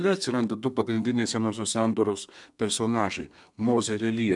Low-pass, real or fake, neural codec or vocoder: 10.8 kHz; fake; codec, 32 kHz, 1.9 kbps, SNAC